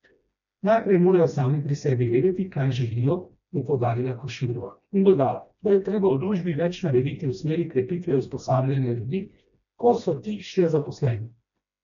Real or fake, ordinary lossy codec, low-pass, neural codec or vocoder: fake; none; 7.2 kHz; codec, 16 kHz, 1 kbps, FreqCodec, smaller model